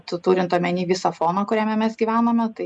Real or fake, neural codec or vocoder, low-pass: real; none; 10.8 kHz